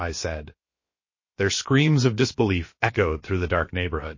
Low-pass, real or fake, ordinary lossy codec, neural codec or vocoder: 7.2 kHz; fake; MP3, 32 kbps; codec, 16 kHz, about 1 kbps, DyCAST, with the encoder's durations